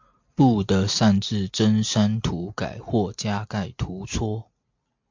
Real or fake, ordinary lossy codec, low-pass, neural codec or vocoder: real; MP3, 48 kbps; 7.2 kHz; none